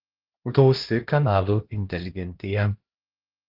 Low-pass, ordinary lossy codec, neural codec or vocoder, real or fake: 5.4 kHz; Opus, 16 kbps; codec, 16 kHz, 1 kbps, X-Codec, HuBERT features, trained on LibriSpeech; fake